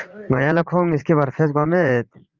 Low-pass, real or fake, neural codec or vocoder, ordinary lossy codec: 7.2 kHz; fake; vocoder, 44.1 kHz, 80 mel bands, Vocos; Opus, 32 kbps